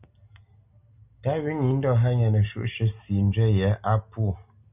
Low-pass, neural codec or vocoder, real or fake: 3.6 kHz; none; real